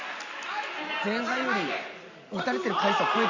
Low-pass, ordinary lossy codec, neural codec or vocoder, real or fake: 7.2 kHz; Opus, 64 kbps; none; real